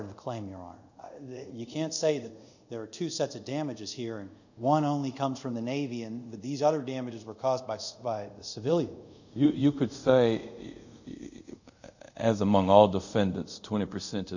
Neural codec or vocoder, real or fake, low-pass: codec, 24 kHz, 0.5 kbps, DualCodec; fake; 7.2 kHz